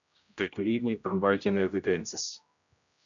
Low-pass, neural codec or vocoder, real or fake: 7.2 kHz; codec, 16 kHz, 0.5 kbps, X-Codec, HuBERT features, trained on general audio; fake